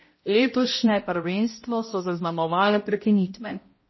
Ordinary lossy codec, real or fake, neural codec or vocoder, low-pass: MP3, 24 kbps; fake; codec, 16 kHz, 0.5 kbps, X-Codec, HuBERT features, trained on balanced general audio; 7.2 kHz